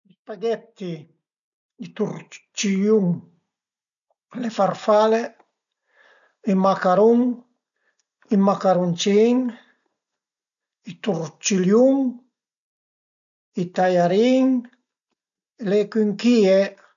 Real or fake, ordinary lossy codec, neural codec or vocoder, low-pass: real; none; none; 7.2 kHz